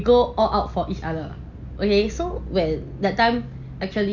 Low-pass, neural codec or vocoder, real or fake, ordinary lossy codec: 7.2 kHz; none; real; none